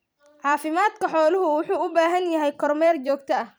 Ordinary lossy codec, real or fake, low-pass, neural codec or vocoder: none; fake; none; vocoder, 44.1 kHz, 128 mel bands every 256 samples, BigVGAN v2